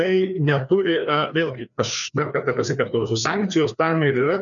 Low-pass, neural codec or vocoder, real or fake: 7.2 kHz; codec, 16 kHz, 2 kbps, FreqCodec, larger model; fake